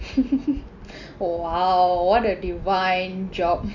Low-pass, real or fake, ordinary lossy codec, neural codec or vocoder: 7.2 kHz; real; none; none